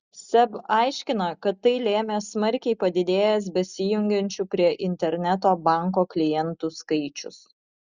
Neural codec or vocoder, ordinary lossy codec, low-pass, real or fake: none; Opus, 64 kbps; 7.2 kHz; real